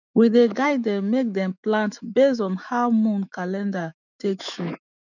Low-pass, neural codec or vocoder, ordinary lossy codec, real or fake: 7.2 kHz; autoencoder, 48 kHz, 128 numbers a frame, DAC-VAE, trained on Japanese speech; none; fake